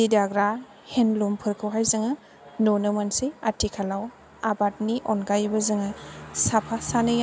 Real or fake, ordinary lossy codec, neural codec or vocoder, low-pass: real; none; none; none